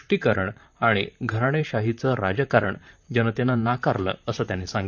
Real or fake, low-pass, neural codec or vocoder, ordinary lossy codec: fake; 7.2 kHz; vocoder, 44.1 kHz, 128 mel bands, Pupu-Vocoder; none